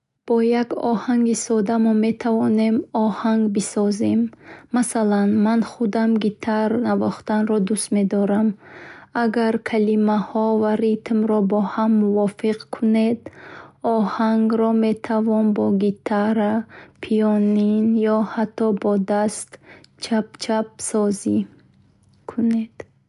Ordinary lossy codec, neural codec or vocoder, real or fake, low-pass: none; none; real; 10.8 kHz